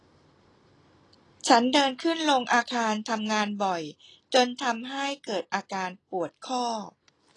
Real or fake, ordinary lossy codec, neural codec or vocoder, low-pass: real; AAC, 32 kbps; none; 10.8 kHz